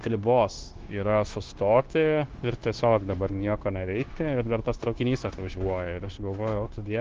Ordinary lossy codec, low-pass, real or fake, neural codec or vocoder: Opus, 16 kbps; 7.2 kHz; fake; codec, 16 kHz, 0.9 kbps, LongCat-Audio-Codec